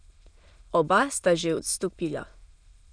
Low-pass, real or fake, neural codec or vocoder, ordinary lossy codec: 9.9 kHz; fake; autoencoder, 22.05 kHz, a latent of 192 numbers a frame, VITS, trained on many speakers; none